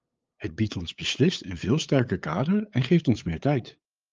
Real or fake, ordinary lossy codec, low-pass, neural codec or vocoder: fake; Opus, 24 kbps; 7.2 kHz; codec, 16 kHz, 8 kbps, FunCodec, trained on LibriTTS, 25 frames a second